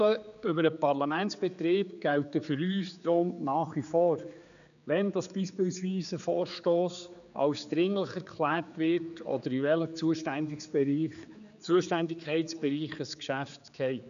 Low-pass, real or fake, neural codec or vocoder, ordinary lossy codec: 7.2 kHz; fake; codec, 16 kHz, 4 kbps, X-Codec, HuBERT features, trained on general audio; none